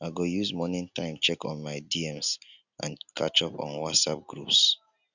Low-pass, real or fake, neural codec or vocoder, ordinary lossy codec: 7.2 kHz; real; none; none